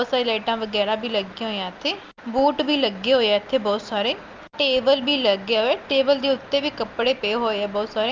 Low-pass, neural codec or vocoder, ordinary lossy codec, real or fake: 7.2 kHz; none; Opus, 24 kbps; real